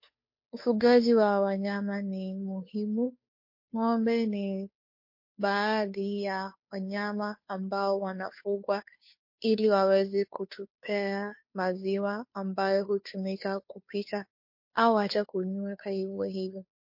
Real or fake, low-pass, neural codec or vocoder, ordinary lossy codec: fake; 5.4 kHz; codec, 16 kHz, 2 kbps, FunCodec, trained on Chinese and English, 25 frames a second; MP3, 32 kbps